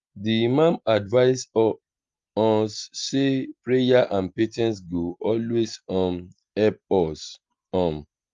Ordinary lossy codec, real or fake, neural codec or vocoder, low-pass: Opus, 32 kbps; real; none; 7.2 kHz